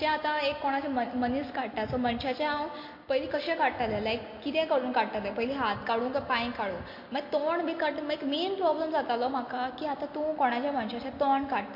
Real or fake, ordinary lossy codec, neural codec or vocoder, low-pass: real; MP3, 32 kbps; none; 5.4 kHz